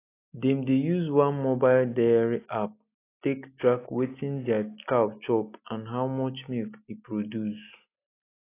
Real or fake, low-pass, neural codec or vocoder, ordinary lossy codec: real; 3.6 kHz; none; AAC, 24 kbps